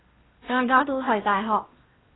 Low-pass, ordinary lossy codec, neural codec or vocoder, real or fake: 7.2 kHz; AAC, 16 kbps; codec, 16 kHz in and 24 kHz out, 0.8 kbps, FocalCodec, streaming, 65536 codes; fake